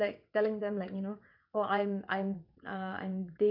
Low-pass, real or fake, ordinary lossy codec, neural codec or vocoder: 5.4 kHz; fake; none; vocoder, 22.05 kHz, 80 mel bands, WaveNeXt